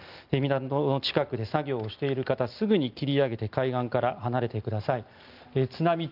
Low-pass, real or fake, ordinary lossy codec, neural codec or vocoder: 5.4 kHz; real; Opus, 24 kbps; none